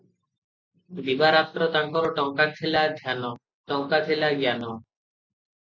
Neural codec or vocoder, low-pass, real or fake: none; 7.2 kHz; real